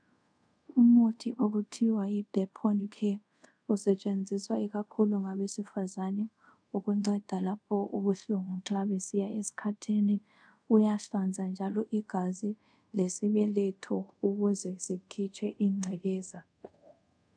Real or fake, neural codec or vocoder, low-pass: fake; codec, 24 kHz, 0.5 kbps, DualCodec; 9.9 kHz